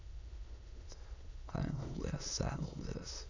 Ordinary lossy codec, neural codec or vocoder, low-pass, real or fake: none; autoencoder, 22.05 kHz, a latent of 192 numbers a frame, VITS, trained on many speakers; 7.2 kHz; fake